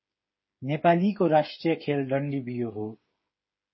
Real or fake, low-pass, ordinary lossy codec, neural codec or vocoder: fake; 7.2 kHz; MP3, 24 kbps; codec, 16 kHz, 8 kbps, FreqCodec, smaller model